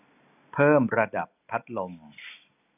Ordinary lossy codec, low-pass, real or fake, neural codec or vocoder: none; 3.6 kHz; real; none